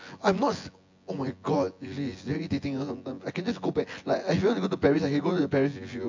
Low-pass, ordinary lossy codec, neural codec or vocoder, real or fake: 7.2 kHz; MP3, 48 kbps; vocoder, 24 kHz, 100 mel bands, Vocos; fake